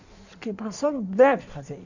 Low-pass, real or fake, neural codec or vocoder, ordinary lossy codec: 7.2 kHz; fake; codec, 16 kHz in and 24 kHz out, 1.1 kbps, FireRedTTS-2 codec; none